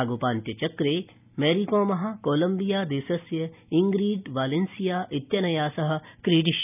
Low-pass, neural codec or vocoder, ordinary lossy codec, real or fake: 3.6 kHz; none; none; real